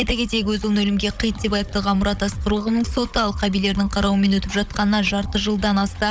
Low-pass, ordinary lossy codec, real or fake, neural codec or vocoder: none; none; fake; codec, 16 kHz, 16 kbps, FunCodec, trained on Chinese and English, 50 frames a second